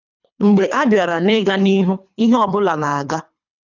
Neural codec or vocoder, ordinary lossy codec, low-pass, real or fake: codec, 24 kHz, 3 kbps, HILCodec; none; 7.2 kHz; fake